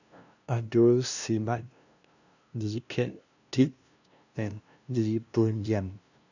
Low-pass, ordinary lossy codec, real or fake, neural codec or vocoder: 7.2 kHz; none; fake; codec, 16 kHz, 0.5 kbps, FunCodec, trained on LibriTTS, 25 frames a second